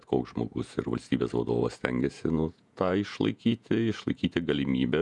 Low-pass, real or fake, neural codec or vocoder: 10.8 kHz; fake; vocoder, 48 kHz, 128 mel bands, Vocos